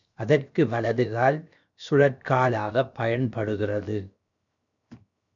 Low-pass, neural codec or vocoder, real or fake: 7.2 kHz; codec, 16 kHz, 0.7 kbps, FocalCodec; fake